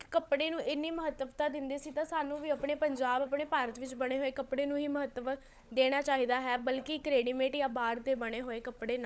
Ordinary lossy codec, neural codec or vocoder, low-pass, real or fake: none; codec, 16 kHz, 16 kbps, FunCodec, trained on LibriTTS, 50 frames a second; none; fake